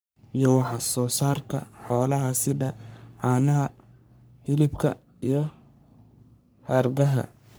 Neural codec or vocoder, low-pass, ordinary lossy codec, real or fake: codec, 44.1 kHz, 3.4 kbps, Pupu-Codec; none; none; fake